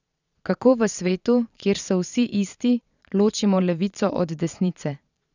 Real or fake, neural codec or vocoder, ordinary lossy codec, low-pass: fake; vocoder, 22.05 kHz, 80 mel bands, Vocos; none; 7.2 kHz